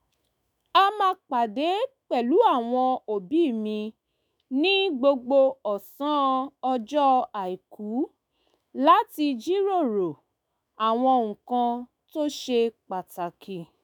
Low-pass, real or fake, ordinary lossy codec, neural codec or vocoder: none; fake; none; autoencoder, 48 kHz, 128 numbers a frame, DAC-VAE, trained on Japanese speech